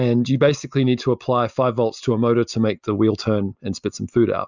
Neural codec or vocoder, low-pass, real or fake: none; 7.2 kHz; real